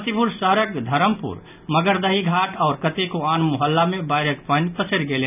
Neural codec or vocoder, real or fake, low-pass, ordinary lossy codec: none; real; 3.6 kHz; none